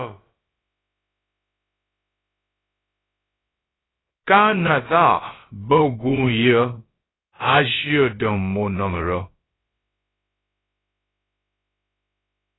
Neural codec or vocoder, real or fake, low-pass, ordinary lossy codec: codec, 16 kHz, about 1 kbps, DyCAST, with the encoder's durations; fake; 7.2 kHz; AAC, 16 kbps